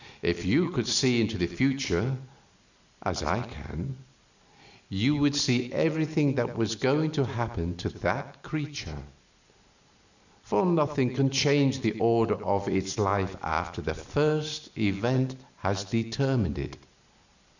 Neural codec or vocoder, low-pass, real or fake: none; 7.2 kHz; real